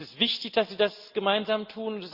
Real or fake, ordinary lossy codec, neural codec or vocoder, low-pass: real; Opus, 24 kbps; none; 5.4 kHz